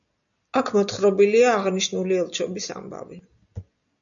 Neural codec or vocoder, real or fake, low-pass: none; real; 7.2 kHz